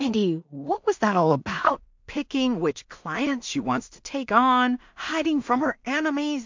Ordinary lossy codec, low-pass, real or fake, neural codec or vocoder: MP3, 64 kbps; 7.2 kHz; fake; codec, 16 kHz in and 24 kHz out, 0.4 kbps, LongCat-Audio-Codec, two codebook decoder